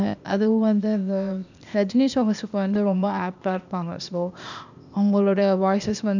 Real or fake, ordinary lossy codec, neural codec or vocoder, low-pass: fake; none; codec, 16 kHz, 0.8 kbps, ZipCodec; 7.2 kHz